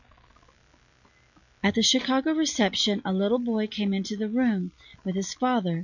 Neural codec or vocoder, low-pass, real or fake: none; 7.2 kHz; real